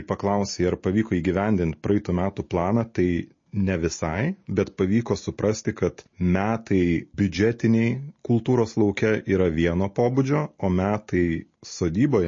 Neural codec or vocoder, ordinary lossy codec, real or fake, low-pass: none; MP3, 32 kbps; real; 7.2 kHz